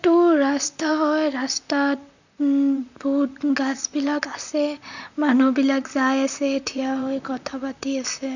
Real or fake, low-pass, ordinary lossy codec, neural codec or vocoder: fake; 7.2 kHz; none; vocoder, 44.1 kHz, 128 mel bands, Pupu-Vocoder